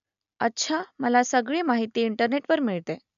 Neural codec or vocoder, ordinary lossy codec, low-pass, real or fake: none; none; 7.2 kHz; real